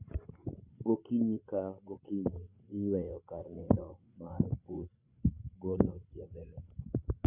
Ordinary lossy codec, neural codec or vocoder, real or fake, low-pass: Opus, 64 kbps; codec, 16 kHz, 8 kbps, FreqCodec, larger model; fake; 3.6 kHz